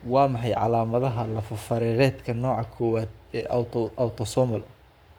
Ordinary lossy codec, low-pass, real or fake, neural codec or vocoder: none; none; fake; codec, 44.1 kHz, 7.8 kbps, Pupu-Codec